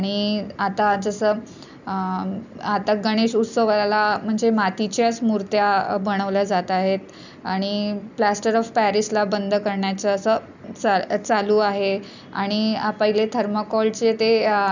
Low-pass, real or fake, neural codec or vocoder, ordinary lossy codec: 7.2 kHz; real; none; none